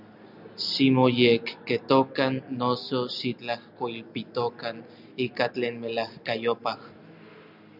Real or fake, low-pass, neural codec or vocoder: real; 5.4 kHz; none